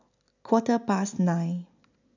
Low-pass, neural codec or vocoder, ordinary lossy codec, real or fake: 7.2 kHz; none; none; real